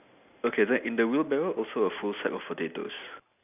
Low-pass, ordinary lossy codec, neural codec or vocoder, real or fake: 3.6 kHz; none; none; real